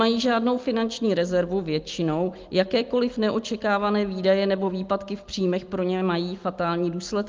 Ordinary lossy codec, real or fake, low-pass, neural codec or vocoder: Opus, 32 kbps; real; 7.2 kHz; none